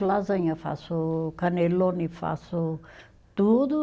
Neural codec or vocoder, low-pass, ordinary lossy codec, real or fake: none; none; none; real